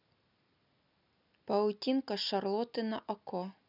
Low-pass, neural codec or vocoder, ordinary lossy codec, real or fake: 5.4 kHz; none; none; real